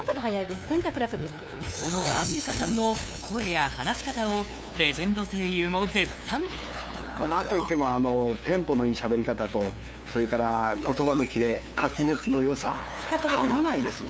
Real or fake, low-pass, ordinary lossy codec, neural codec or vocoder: fake; none; none; codec, 16 kHz, 2 kbps, FunCodec, trained on LibriTTS, 25 frames a second